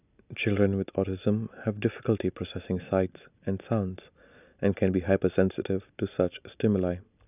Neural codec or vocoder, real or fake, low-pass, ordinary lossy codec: none; real; 3.6 kHz; none